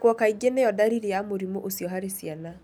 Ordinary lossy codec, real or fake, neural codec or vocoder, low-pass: none; real; none; none